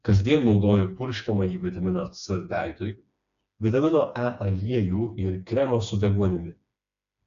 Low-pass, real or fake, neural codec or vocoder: 7.2 kHz; fake; codec, 16 kHz, 2 kbps, FreqCodec, smaller model